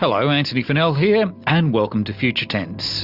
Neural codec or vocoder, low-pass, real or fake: none; 5.4 kHz; real